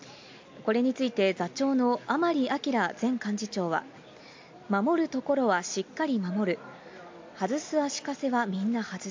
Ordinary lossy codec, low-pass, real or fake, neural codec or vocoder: MP3, 48 kbps; 7.2 kHz; real; none